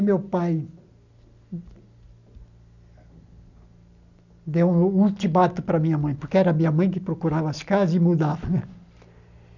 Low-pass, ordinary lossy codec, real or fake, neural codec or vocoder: 7.2 kHz; none; real; none